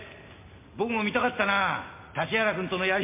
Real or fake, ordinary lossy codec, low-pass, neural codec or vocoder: real; MP3, 24 kbps; 3.6 kHz; none